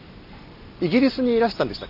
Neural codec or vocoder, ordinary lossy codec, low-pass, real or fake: none; none; 5.4 kHz; real